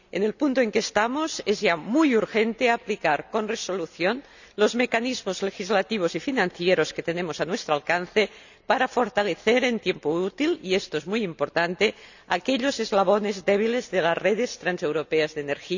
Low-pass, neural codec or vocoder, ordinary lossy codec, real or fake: 7.2 kHz; none; none; real